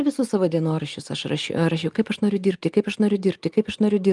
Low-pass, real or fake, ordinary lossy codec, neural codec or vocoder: 10.8 kHz; real; Opus, 32 kbps; none